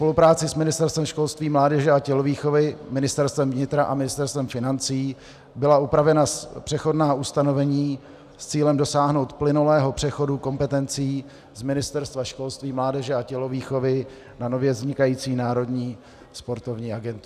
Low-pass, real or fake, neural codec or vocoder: 14.4 kHz; real; none